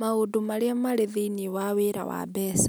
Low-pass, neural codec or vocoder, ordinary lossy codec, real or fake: none; none; none; real